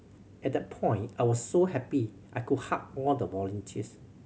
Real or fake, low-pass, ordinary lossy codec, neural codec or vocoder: real; none; none; none